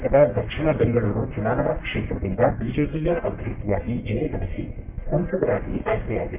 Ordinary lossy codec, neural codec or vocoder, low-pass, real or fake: none; codec, 44.1 kHz, 1.7 kbps, Pupu-Codec; 3.6 kHz; fake